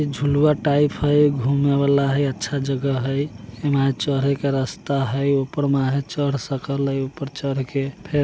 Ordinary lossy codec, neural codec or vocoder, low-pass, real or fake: none; none; none; real